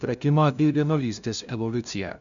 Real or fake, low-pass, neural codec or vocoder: fake; 7.2 kHz; codec, 16 kHz, 0.5 kbps, FunCodec, trained on LibriTTS, 25 frames a second